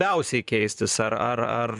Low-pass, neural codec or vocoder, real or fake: 10.8 kHz; vocoder, 44.1 kHz, 128 mel bands, Pupu-Vocoder; fake